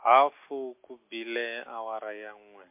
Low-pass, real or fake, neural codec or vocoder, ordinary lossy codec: 3.6 kHz; real; none; MP3, 24 kbps